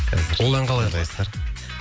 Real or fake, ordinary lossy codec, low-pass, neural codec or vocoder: real; none; none; none